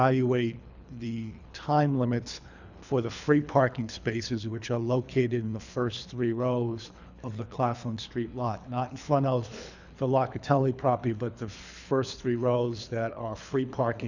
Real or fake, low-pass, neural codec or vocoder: fake; 7.2 kHz; codec, 24 kHz, 3 kbps, HILCodec